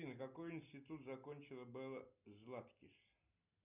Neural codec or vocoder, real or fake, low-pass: none; real; 3.6 kHz